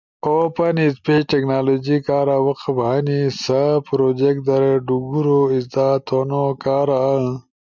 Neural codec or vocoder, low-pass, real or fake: none; 7.2 kHz; real